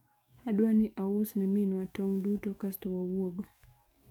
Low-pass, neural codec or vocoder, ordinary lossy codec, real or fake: 19.8 kHz; codec, 44.1 kHz, 7.8 kbps, DAC; none; fake